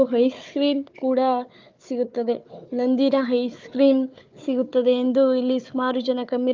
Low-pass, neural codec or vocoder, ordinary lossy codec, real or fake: 7.2 kHz; codec, 16 kHz, 4 kbps, FunCodec, trained on Chinese and English, 50 frames a second; Opus, 24 kbps; fake